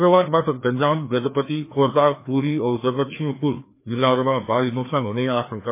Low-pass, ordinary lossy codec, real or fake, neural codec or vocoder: 3.6 kHz; MP3, 24 kbps; fake; codec, 16 kHz, 2 kbps, FreqCodec, larger model